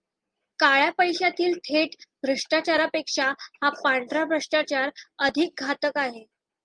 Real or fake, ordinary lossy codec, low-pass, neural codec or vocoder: real; Opus, 32 kbps; 9.9 kHz; none